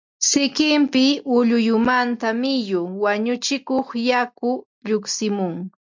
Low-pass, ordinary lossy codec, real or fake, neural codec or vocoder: 7.2 kHz; MP3, 64 kbps; real; none